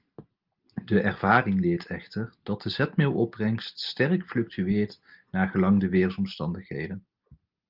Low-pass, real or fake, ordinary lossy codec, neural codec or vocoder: 5.4 kHz; real; Opus, 32 kbps; none